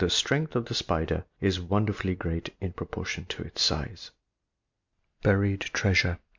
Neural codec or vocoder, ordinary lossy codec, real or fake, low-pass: none; MP3, 64 kbps; real; 7.2 kHz